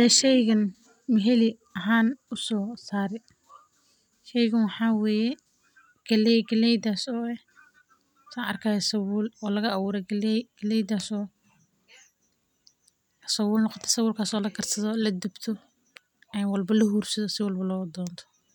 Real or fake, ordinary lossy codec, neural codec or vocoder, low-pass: real; none; none; 19.8 kHz